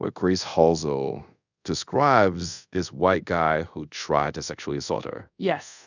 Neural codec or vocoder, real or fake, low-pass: codec, 24 kHz, 0.5 kbps, DualCodec; fake; 7.2 kHz